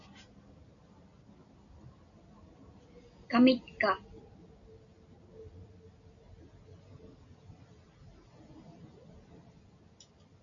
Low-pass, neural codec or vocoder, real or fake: 7.2 kHz; none; real